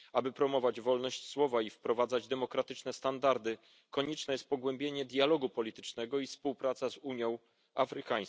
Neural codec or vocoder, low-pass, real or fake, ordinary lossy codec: none; none; real; none